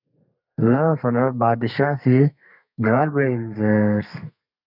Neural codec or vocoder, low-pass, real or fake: codec, 32 kHz, 1.9 kbps, SNAC; 5.4 kHz; fake